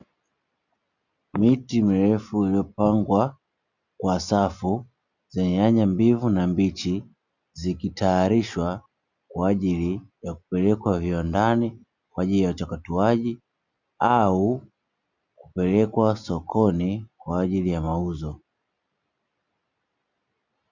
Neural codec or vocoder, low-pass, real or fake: none; 7.2 kHz; real